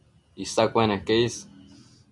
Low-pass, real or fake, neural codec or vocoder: 10.8 kHz; real; none